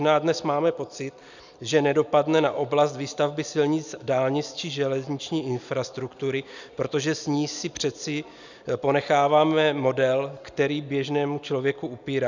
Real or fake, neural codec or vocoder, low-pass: real; none; 7.2 kHz